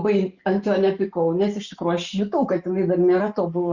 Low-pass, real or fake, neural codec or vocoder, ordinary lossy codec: 7.2 kHz; real; none; Opus, 64 kbps